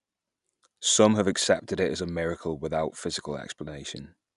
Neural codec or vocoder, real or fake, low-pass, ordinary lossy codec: none; real; 10.8 kHz; none